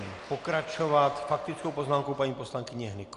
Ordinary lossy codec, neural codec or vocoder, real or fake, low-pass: AAC, 48 kbps; none; real; 10.8 kHz